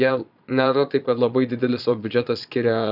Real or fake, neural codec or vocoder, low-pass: fake; vocoder, 22.05 kHz, 80 mel bands, Vocos; 5.4 kHz